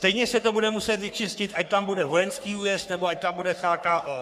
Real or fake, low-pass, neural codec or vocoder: fake; 14.4 kHz; codec, 44.1 kHz, 3.4 kbps, Pupu-Codec